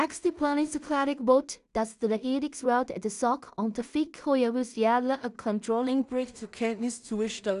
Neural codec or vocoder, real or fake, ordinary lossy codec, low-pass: codec, 16 kHz in and 24 kHz out, 0.4 kbps, LongCat-Audio-Codec, two codebook decoder; fake; none; 10.8 kHz